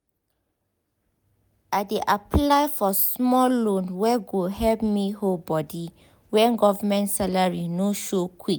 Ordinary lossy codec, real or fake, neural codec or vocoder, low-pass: none; real; none; none